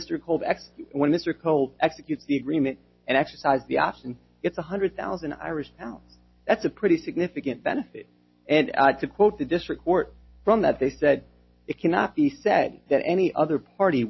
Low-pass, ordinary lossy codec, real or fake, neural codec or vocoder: 7.2 kHz; MP3, 24 kbps; real; none